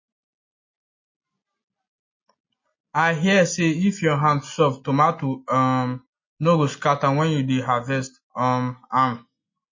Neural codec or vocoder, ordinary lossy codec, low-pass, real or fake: none; MP3, 32 kbps; 7.2 kHz; real